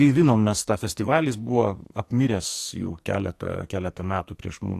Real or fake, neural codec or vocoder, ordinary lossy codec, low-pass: fake; codec, 44.1 kHz, 2.6 kbps, SNAC; AAC, 64 kbps; 14.4 kHz